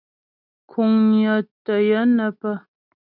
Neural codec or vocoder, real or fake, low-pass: none; real; 5.4 kHz